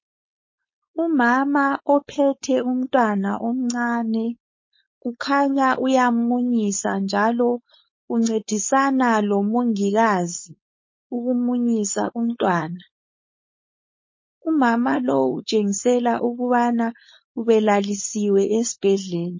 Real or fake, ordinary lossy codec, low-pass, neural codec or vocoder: fake; MP3, 32 kbps; 7.2 kHz; codec, 16 kHz, 4.8 kbps, FACodec